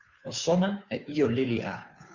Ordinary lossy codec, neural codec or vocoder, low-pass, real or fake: Opus, 64 kbps; codec, 16 kHz, 2 kbps, FunCodec, trained on Chinese and English, 25 frames a second; 7.2 kHz; fake